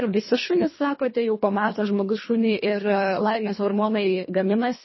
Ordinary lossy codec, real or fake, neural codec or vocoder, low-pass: MP3, 24 kbps; fake; codec, 24 kHz, 1.5 kbps, HILCodec; 7.2 kHz